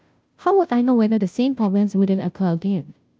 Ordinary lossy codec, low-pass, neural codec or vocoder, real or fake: none; none; codec, 16 kHz, 0.5 kbps, FunCodec, trained on Chinese and English, 25 frames a second; fake